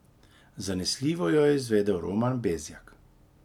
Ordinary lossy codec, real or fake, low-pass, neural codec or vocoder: none; real; 19.8 kHz; none